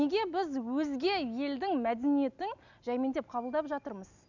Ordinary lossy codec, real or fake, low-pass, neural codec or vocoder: none; real; 7.2 kHz; none